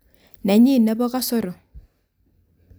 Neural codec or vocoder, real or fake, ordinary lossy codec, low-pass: none; real; none; none